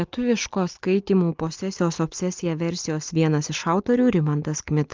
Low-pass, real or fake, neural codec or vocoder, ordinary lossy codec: 7.2 kHz; real; none; Opus, 32 kbps